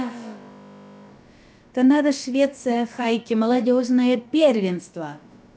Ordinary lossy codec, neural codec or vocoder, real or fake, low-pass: none; codec, 16 kHz, about 1 kbps, DyCAST, with the encoder's durations; fake; none